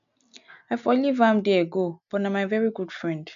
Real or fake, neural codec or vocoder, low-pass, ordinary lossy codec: real; none; 7.2 kHz; none